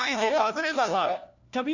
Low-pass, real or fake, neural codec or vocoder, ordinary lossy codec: 7.2 kHz; fake; codec, 16 kHz, 1 kbps, FunCodec, trained on LibriTTS, 50 frames a second; none